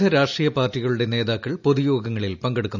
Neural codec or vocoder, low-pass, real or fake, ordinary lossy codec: none; 7.2 kHz; real; none